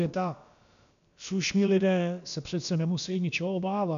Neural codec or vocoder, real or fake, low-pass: codec, 16 kHz, about 1 kbps, DyCAST, with the encoder's durations; fake; 7.2 kHz